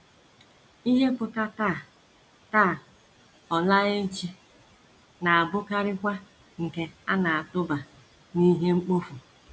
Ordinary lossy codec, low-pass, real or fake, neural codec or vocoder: none; none; real; none